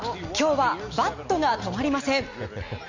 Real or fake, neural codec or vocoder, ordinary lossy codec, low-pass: real; none; MP3, 64 kbps; 7.2 kHz